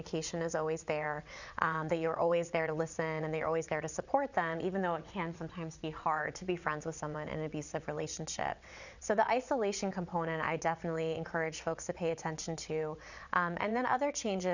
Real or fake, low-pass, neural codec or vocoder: fake; 7.2 kHz; vocoder, 22.05 kHz, 80 mel bands, WaveNeXt